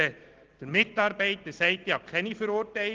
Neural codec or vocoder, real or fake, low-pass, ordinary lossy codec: none; real; 7.2 kHz; Opus, 16 kbps